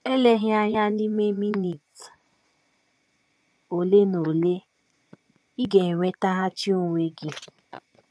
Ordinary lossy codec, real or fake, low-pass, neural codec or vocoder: none; fake; none; vocoder, 22.05 kHz, 80 mel bands, Vocos